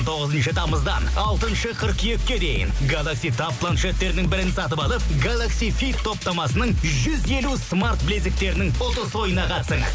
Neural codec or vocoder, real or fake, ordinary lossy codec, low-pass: none; real; none; none